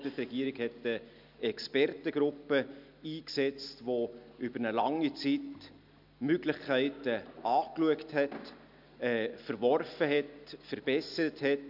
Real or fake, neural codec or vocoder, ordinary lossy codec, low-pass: real; none; none; 5.4 kHz